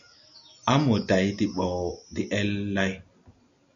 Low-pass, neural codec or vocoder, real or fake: 7.2 kHz; none; real